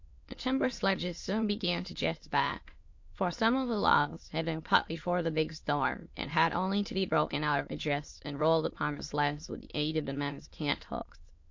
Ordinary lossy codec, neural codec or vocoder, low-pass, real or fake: MP3, 48 kbps; autoencoder, 22.05 kHz, a latent of 192 numbers a frame, VITS, trained on many speakers; 7.2 kHz; fake